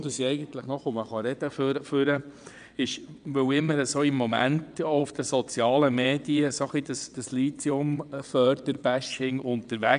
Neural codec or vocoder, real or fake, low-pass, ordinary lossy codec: vocoder, 22.05 kHz, 80 mel bands, WaveNeXt; fake; 9.9 kHz; none